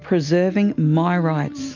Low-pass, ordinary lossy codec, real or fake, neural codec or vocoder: 7.2 kHz; MP3, 64 kbps; real; none